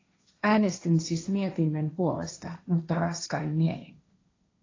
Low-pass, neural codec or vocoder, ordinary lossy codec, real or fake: 7.2 kHz; codec, 16 kHz, 1.1 kbps, Voila-Tokenizer; AAC, 32 kbps; fake